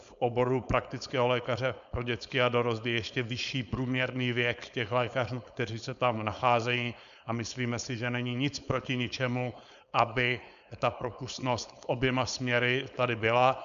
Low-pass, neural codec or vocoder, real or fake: 7.2 kHz; codec, 16 kHz, 4.8 kbps, FACodec; fake